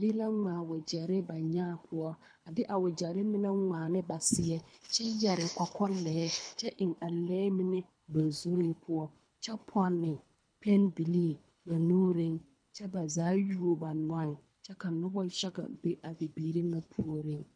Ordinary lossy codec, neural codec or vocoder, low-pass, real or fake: MP3, 64 kbps; codec, 24 kHz, 3 kbps, HILCodec; 9.9 kHz; fake